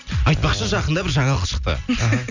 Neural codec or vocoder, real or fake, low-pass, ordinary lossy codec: none; real; 7.2 kHz; none